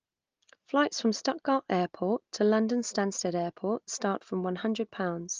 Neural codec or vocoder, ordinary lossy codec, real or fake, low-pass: none; Opus, 24 kbps; real; 7.2 kHz